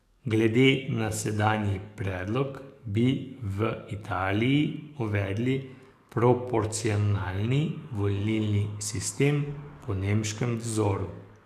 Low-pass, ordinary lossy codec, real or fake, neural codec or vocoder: 14.4 kHz; none; fake; codec, 44.1 kHz, 7.8 kbps, DAC